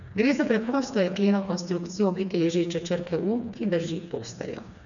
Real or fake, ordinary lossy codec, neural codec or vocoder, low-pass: fake; none; codec, 16 kHz, 2 kbps, FreqCodec, smaller model; 7.2 kHz